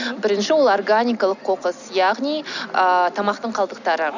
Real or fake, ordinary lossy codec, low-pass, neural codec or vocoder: real; none; 7.2 kHz; none